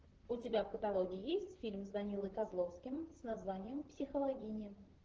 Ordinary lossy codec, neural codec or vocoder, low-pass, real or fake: Opus, 16 kbps; vocoder, 44.1 kHz, 128 mel bands, Pupu-Vocoder; 7.2 kHz; fake